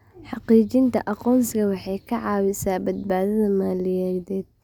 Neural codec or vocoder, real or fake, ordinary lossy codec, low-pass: none; real; none; 19.8 kHz